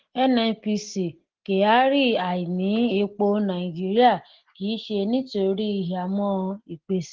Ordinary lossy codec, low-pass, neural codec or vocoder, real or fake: Opus, 16 kbps; 7.2 kHz; none; real